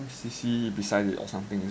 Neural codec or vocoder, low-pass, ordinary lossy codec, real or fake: none; none; none; real